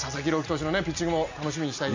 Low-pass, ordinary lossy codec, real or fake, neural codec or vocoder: 7.2 kHz; MP3, 64 kbps; real; none